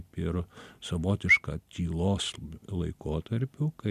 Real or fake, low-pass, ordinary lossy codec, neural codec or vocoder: real; 14.4 kHz; MP3, 96 kbps; none